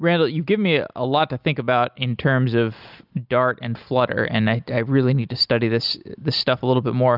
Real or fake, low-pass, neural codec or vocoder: real; 5.4 kHz; none